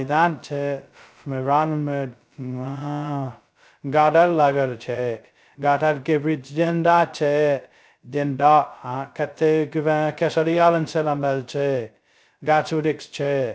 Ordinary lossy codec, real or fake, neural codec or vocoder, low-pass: none; fake; codec, 16 kHz, 0.2 kbps, FocalCodec; none